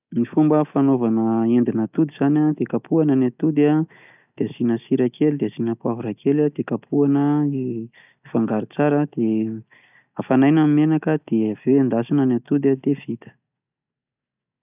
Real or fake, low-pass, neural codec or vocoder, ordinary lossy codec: real; 3.6 kHz; none; none